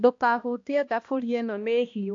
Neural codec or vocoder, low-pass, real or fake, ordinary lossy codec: codec, 16 kHz, 1 kbps, X-Codec, HuBERT features, trained on balanced general audio; 7.2 kHz; fake; AAC, 48 kbps